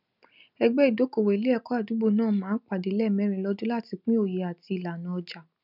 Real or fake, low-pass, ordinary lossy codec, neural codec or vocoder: real; 5.4 kHz; none; none